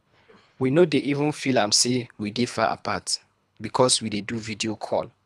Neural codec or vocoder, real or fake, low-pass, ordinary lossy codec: codec, 24 kHz, 3 kbps, HILCodec; fake; none; none